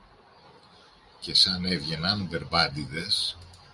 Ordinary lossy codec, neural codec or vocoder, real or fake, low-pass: Opus, 64 kbps; none; real; 10.8 kHz